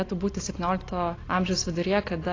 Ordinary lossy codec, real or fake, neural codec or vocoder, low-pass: AAC, 32 kbps; real; none; 7.2 kHz